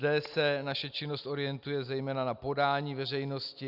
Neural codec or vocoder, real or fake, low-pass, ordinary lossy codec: none; real; 5.4 kHz; Opus, 64 kbps